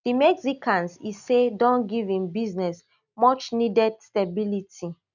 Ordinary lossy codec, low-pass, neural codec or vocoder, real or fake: none; 7.2 kHz; none; real